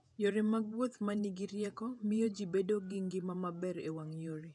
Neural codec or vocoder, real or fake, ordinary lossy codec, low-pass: vocoder, 48 kHz, 128 mel bands, Vocos; fake; none; 10.8 kHz